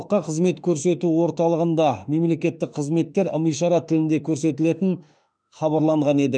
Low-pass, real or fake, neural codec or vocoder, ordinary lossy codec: 9.9 kHz; fake; autoencoder, 48 kHz, 32 numbers a frame, DAC-VAE, trained on Japanese speech; none